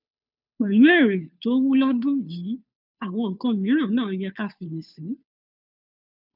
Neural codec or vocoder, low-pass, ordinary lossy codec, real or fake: codec, 16 kHz, 2 kbps, FunCodec, trained on Chinese and English, 25 frames a second; 5.4 kHz; none; fake